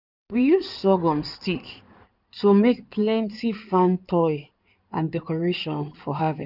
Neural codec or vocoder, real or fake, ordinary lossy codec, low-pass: codec, 16 kHz in and 24 kHz out, 2.2 kbps, FireRedTTS-2 codec; fake; none; 5.4 kHz